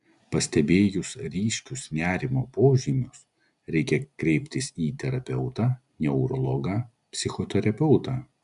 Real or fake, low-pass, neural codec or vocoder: real; 10.8 kHz; none